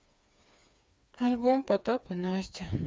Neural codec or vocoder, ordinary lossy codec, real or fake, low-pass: codec, 16 kHz, 4 kbps, FreqCodec, smaller model; none; fake; none